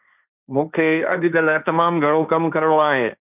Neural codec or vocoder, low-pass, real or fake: codec, 16 kHz, 1.1 kbps, Voila-Tokenizer; 3.6 kHz; fake